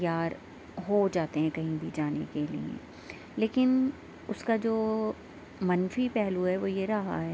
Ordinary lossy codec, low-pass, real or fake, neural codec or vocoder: none; none; real; none